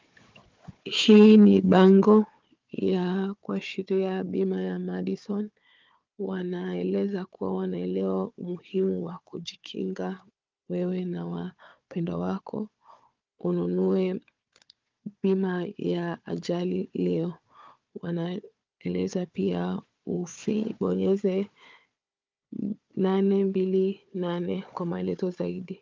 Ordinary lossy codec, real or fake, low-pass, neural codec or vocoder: Opus, 24 kbps; fake; 7.2 kHz; codec, 16 kHz, 4 kbps, FunCodec, trained on Chinese and English, 50 frames a second